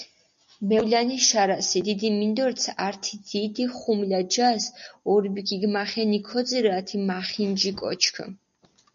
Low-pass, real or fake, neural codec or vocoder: 7.2 kHz; real; none